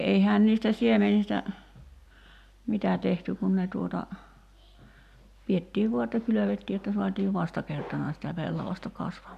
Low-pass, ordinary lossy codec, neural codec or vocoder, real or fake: 14.4 kHz; none; none; real